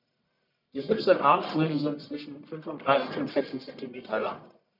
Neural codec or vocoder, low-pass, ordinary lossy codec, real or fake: codec, 44.1 kHz, 1.7 kbps, Pupu-Codec; 5.4 kHz; none; fake